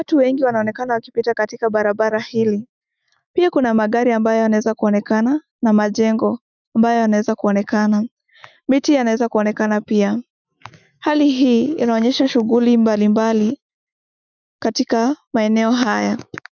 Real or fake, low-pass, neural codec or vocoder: fake; 7.2 kHz; autoencoder, 48 kHz, 128 numbers a frame, DAC-VAE, trained on Japanese speech